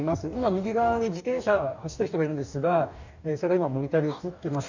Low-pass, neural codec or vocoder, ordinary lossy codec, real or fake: 7.2 kHz; codec, 44.1 kHz, 2.6 kbps, DAC; none; fake